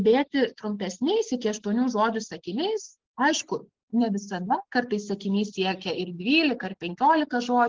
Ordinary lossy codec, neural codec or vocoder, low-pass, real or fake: Opus, 16 kbps; none; 7.2 kHz; real